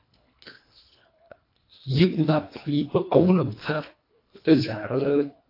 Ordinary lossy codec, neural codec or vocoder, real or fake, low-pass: AAC, 32 kbps; codec, 24 kHz, 1.5 kbps, HILCodec; fake; 5.4 kHz